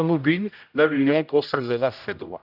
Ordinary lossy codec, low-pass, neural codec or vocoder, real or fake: none; 5.4 kHz; codec, 16 kHz, 0.5 kbps, X-Codec, HuBERT features, trained on general audio; fake